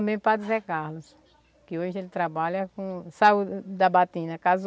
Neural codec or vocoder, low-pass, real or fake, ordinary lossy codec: none; none; real; none